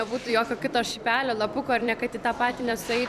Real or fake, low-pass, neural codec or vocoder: fake; 14.4 kHz; vocoder, 44.1 kHz, 128 mel bands every 256 samples, BigVGAN v2